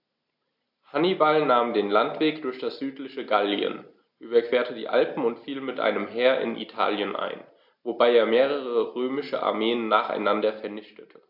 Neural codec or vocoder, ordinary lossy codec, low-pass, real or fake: none; AAC, 48 kbps; 5.4 kHz; real